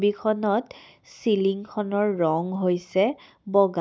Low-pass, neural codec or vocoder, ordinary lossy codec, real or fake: none; none; none; real